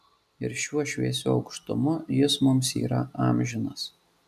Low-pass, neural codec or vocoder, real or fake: 14.4 kHz; none; real